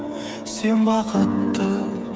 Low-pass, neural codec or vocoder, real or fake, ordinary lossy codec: none; codec, 16 kHz, 8 kbps, FreqCodec, smaller model; fake; none